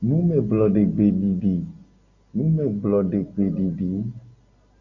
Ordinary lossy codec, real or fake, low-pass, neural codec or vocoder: MP3, 48 kbps; real; 7.2 kHz; none